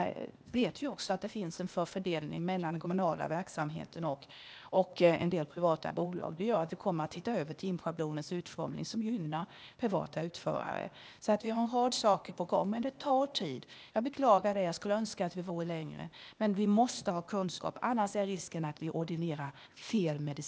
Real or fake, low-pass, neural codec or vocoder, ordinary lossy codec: fake; none; codec, 16 kHz, 0.8 kbps, ZipCodec; none